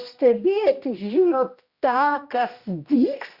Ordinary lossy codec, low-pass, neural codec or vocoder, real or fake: Opus, 64 kbps; 5.4 kHz; codec, 16 kHz, 1 kbps, X-Codec, HuBERT features, trained on general audio; fake